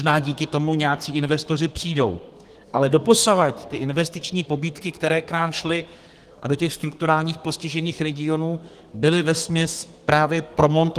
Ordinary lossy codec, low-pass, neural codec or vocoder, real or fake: Opus, 24 kbps; 14.4 kHz; codec, 32 kHz, 1.9 kbps, SNAC; fake